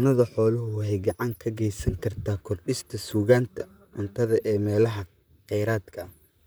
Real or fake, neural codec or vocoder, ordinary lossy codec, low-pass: fake; vocoder, 44.1 kHz, 128 mel bands, Pupu-Vocoder; none; none